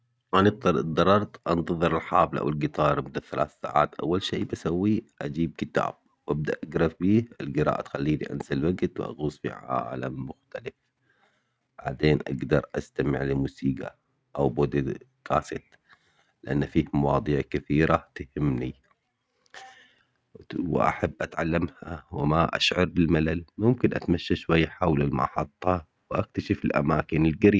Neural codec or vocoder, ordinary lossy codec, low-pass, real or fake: none; none; none; real